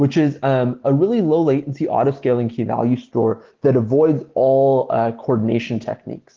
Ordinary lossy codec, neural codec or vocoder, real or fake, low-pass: Opus, 16 kbps; none; real; 7.2 kHz